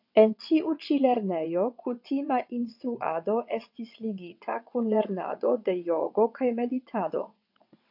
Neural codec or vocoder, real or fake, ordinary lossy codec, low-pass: vocoder, 22.05 kHz, 80 mel bands, WaveNeXt; fake; AAC, 48 kbps; 5.4 kHz